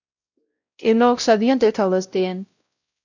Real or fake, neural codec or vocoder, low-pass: fake; codec, 16 kHz, 0.5 kbps, X-Codec, WavLM features, trained on Multilingual LibriSpeech; 7.2 kHz